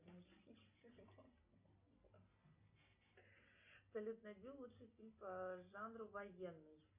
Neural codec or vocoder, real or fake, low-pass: none; real; 3.6 kHz